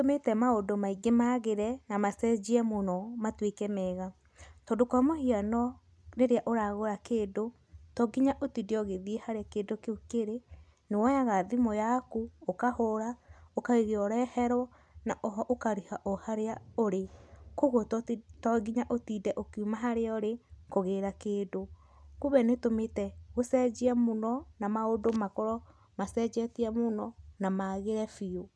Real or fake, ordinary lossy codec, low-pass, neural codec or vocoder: real; none; none; none